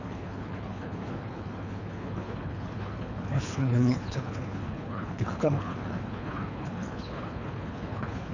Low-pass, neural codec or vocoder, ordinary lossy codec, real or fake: 7.2 kHz; codec, 24 kHz, 3 kbps, HILCodec; none; fake